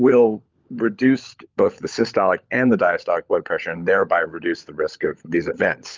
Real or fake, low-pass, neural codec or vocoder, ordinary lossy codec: fake; 7.2 kHz; codec, 16 kHz, 16 kbps, FunCodec, trained on LibriTTS, 50 frames a second; Opus, 24 kbps